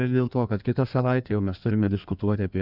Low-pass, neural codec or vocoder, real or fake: 5.4 kHz; codec, 16 kHz in and 24 kHz out, 1.1 kbps, FireRedTTS-2 codec; fake